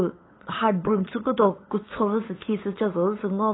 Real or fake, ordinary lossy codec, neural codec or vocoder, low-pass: fake; AAC, 16 kbps; codec, 16 kHz, 8 kbps, FunCodec, trained on LibriTTS, 25 frames a second; 7.2 kHz